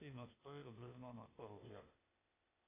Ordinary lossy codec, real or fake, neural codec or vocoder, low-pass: AAC, 32 kbps; fake; codec, 16 kHz, 0.8 kbps, ZipCodec; 3.6 kHz